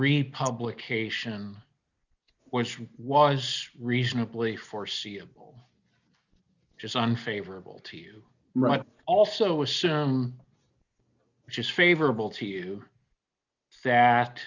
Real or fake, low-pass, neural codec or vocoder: real; 7.2 kHz; none